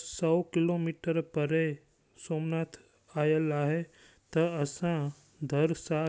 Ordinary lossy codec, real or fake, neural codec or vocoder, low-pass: none; real; none; none